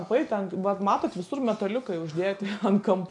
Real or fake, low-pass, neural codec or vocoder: real; 10.8 kHz; none